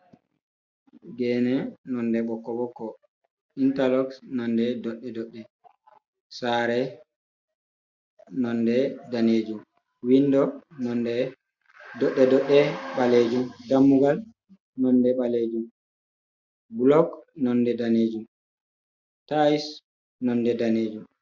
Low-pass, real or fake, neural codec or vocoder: 7.2 kHz; real; none